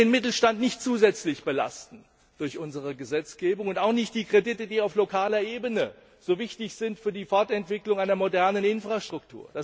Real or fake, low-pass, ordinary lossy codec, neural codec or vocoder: real; none; none; none